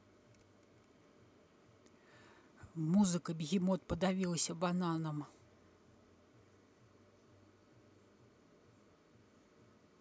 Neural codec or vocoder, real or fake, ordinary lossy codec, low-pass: none; real; none; none